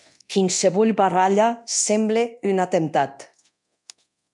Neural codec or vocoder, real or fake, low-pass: codec, 24 kHz, 0.9 kbps, DualCodec; fake; 10.8 kHz